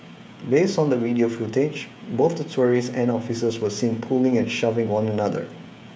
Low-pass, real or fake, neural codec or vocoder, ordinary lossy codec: none; fake; codec, 16 kHz, 16 kbps, FreqCodec, smaller model; none